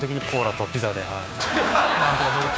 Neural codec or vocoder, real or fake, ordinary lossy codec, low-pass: codec, 16 kHz, 6 kbps, DAC; fake; none; none